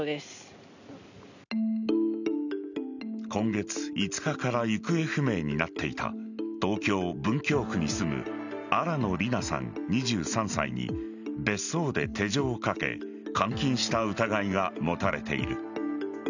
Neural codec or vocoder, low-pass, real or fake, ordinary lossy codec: none; 7.2 kHz; real; none